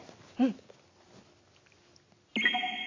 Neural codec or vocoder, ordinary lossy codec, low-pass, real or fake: none; AAC, 32 kbps; 7.2 kHz; real